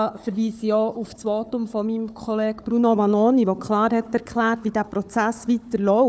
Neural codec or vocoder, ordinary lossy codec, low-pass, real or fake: codec, 16 kHz, 4 kbps, FunCodec, trained on Chinese and English, 50 frames a second; none; none; fake